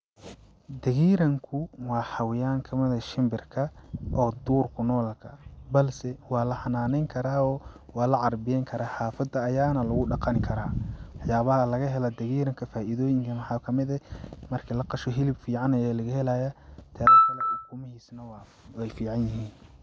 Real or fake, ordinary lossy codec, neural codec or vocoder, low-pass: real; none; none; none